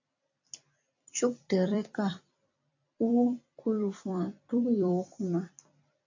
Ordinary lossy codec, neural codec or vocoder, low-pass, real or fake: AAC, 48 kbps; vocoder, 44.1 kHz, 128 mel bands every 256 samples, BigVGAN v2; 7.2 kHz; fake